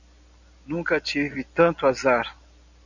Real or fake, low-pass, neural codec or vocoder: real; 7.2 kHz; none